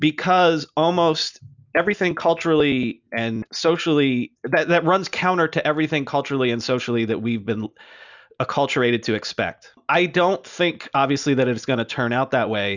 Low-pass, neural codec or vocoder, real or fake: 7.2 kHz; none; real